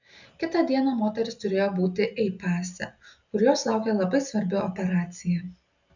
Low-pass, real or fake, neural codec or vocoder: 7.2 kHz; real; none